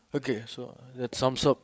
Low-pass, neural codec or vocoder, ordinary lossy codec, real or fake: none; codec, 16 kHz, 8 kbps, FreqCodec, larger model; none; fake